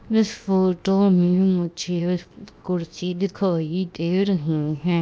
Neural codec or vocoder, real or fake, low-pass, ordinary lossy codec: codec, 16 kHz, 0.7 kbps, FocalCodec; fake; none; none